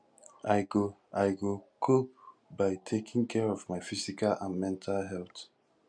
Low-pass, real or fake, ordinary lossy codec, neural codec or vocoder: 9.9 kHz; real; none; none